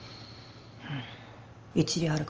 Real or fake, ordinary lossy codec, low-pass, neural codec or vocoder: real; Opus, 24 kbps; 7.2 kHz; none